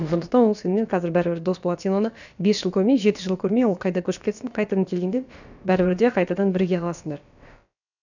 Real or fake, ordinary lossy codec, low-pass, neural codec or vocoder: fake; none; 7.2 kHz; codec, 16 kHz, about 1 kbps, DyCAST, with the encoder's durations